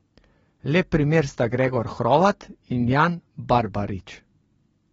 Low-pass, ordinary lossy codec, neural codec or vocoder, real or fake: 9.9 kHz; AAC, 24 kbps; none; real